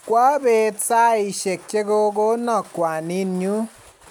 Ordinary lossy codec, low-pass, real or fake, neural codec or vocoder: none; 19.8 kHz; real; none